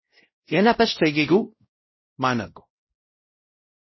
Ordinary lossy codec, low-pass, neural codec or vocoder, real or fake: MP3, 24 kbps; 7.2 kHz; codec, 16 kHz, 0.5 kbps, X-Codec, WavLM features, trained on Multilingual LibriSpeech; fake